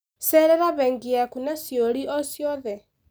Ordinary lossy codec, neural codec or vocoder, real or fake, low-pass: none; none; real; none